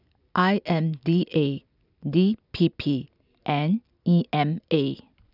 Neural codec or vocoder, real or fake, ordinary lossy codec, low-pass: none; real; none; 5.4 kHz